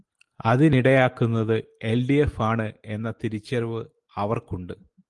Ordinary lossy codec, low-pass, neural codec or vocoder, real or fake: Opus, 24 kbps; 10.8 kHz; none; real